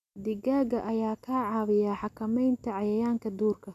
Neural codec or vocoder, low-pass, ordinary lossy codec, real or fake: none; 14.4 kHz; none; real